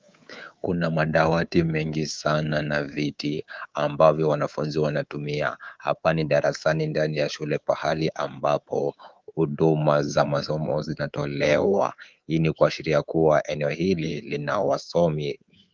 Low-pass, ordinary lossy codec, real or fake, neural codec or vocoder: 7.2 kHz; Opus, 32 kbps; fake; codec, 24 kHz, 3.1 kbps, DualCodec